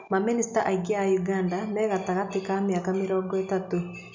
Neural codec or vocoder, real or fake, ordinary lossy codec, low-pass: none; real; MP3, 64 kbps; 7.2 kHz